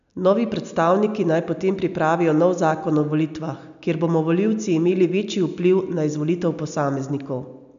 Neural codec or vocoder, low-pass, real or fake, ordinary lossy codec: none; 7.2 kHz; real; none